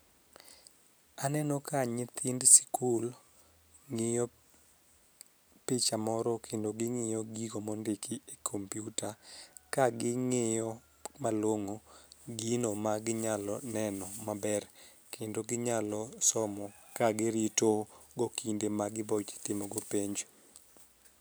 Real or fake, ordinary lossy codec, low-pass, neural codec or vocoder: real; none; none; none